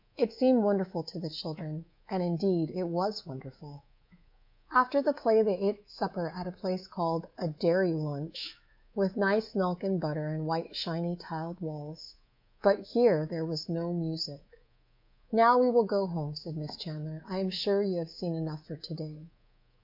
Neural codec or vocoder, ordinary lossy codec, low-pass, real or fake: codec, 24 kHz, 3.1 kbps, DualCodec; MP3, 48 kbps; 5.4 kHz; fake